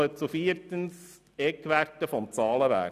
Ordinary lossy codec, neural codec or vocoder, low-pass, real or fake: none; none; 14.4 kHz; real